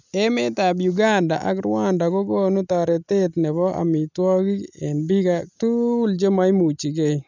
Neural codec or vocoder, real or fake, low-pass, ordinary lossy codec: none; real; 7.2 kHz; none